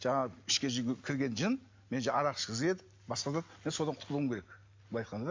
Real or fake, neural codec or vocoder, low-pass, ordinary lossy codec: real; none; 7.2 kHz; MP3, 48 kbps